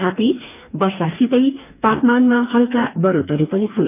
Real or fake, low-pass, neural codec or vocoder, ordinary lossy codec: fake; 3.6 kHz; codec, 44.1 kHz, 2.6 kbps, DAC; none